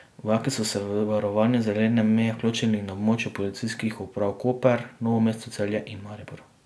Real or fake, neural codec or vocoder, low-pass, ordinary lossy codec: real; none; none; none